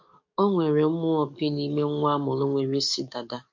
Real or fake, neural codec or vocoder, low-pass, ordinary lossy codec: fake; codec, 44.1 kHz, 7.8 kbps, DAC; 7.2 kHz; MP3, 48 kbps